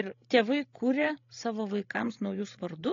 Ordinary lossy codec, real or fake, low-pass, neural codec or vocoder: AAC, 32 kbps; fake; 7.2 kHz; codec, 16 kHz, 16 kbps, FreqCodec, larger model